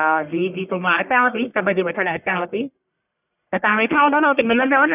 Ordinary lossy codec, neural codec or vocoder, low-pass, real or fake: none; codec, 44.1 kHz, 1.7 kbps, Pupu-Codec; 3.6 kHz; fake